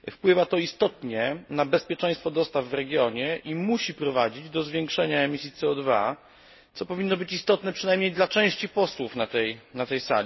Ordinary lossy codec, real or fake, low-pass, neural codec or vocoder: MP3, 24 kbps; real; 7.2 kHz; none